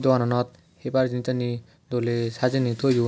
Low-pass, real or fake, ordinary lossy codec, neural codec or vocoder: none; real; none; none